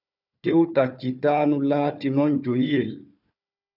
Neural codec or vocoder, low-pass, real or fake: codec, 16 kHz, 4 kbps, FunCodec, trained on Chinese and English, 50 frames a second; 5.4 kHz; fake